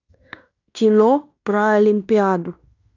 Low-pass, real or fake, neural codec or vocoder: 7.2 kHz; fake; codec, 16 kHz in and 24 kHz out, 0.9 kbps, LongCat-Audio-Codec, fine tuned four codebook decoder